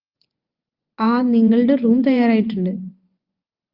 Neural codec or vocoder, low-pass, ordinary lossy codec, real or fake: vocoder, 24 kHz, 100 mel bands, Vocos; 5.4 kHz; Opus, 24 kbps; fake